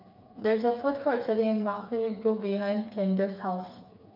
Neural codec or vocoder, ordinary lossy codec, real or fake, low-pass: codec, 16 kHz, 4 kbps, FreqCodec, smaller model; none; fake; 5.4 kHz